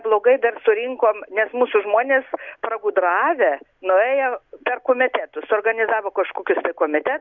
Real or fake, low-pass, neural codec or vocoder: real; 7.2 kHz; none